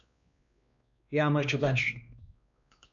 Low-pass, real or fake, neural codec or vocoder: 7.2 kHz; fake; codec, 16 kHz, 2 kbps, X-Codec, WavLM features, trained on Multilingual LibriSpeech